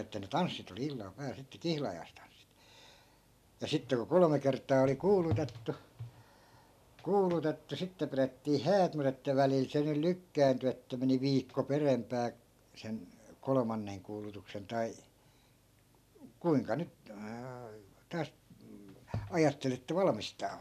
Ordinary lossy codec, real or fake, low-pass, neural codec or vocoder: none; real; 14.4 kHz; none